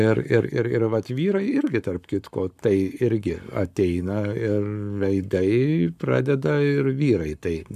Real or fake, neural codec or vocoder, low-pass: fake; codec, 44.1 kHz, 7.8 kbps, Pupu-Codec; 14.4 kHz